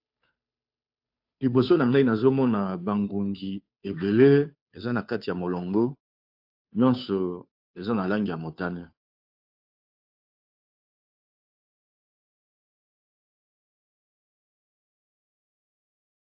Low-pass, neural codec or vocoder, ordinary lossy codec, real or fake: 5.4 kHz; codec, 16 kHz, 2 kbps, FunCodec, trained on Chinese and English, 25 frames a second; AAC, 48 kbps; fake